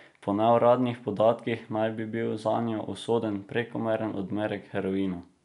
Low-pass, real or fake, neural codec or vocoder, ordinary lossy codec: 10.8 kHz; real; none; none